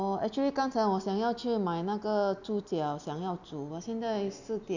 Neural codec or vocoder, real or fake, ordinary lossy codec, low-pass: none; real; none; 7.2 kHz